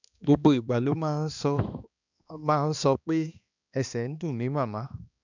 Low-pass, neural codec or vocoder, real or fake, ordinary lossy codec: 7.2 kHz; codec, 16 kHz, 2 kbps, X-Codec, HuBERT features, trained on balanced general audio; fake; none